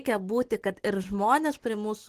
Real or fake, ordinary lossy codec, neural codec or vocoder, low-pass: real; Opus, 16 kbps; none; 14.4 kHz